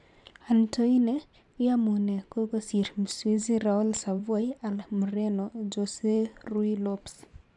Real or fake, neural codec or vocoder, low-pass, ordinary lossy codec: fake; vocoder, 44.1 kHz, 128 mel bands every 512 samples, BigVGAN v2; 10.8 kHz; none